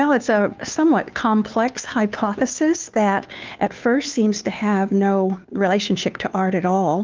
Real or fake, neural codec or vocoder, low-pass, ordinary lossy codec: fake; codec, 16 kHz, 2 kbps, FunCodec, trained on Chinese and English, 25 frames a second; 7.2 kHz; Opus, 24 kbps